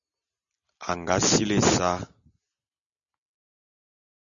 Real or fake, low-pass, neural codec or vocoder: real; 7.2 kHz; none